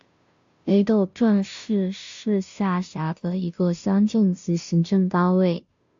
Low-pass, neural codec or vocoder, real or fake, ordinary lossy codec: 7.2 kHz; codec, 16 kHz, 0.5 kbps, FunCodec, trained on Chinese and English, 25 frames a second; fake; AAC, 64 kbps